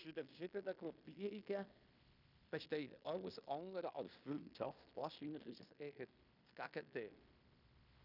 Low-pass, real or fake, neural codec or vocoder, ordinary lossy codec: 5.4 kHz; fake; codec, 16 kHz in and 24 kHz out, 0.9 kbps, LongCat-Audio-Codec, fine tuned four codebook decoder; none